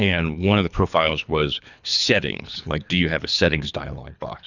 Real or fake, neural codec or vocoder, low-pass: fake; codec, 24 kHz, 3 kbps, HILCodec; 7.2 kHz